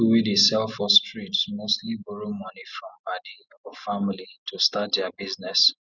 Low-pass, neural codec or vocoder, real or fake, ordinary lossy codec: 7.2 kHz; none; real; Opus, 64 kbps